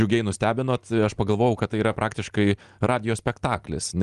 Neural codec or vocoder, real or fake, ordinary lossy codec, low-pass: none; real; Opus, 24 kbps; 10.8 kHz